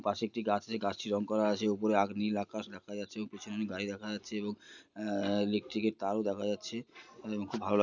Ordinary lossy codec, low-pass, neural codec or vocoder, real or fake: none; 7.2 kHz; none; real